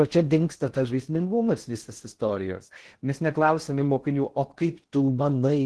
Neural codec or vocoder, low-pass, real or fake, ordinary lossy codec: codec, 16 kHz in and 24 kHz out, 0.6 kbps, FocalCodec, streaming, 4096 codes; 10.8 kHz; fake; Opus, 16 kbps